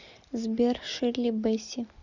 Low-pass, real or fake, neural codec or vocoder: 7.2 kHz; real; none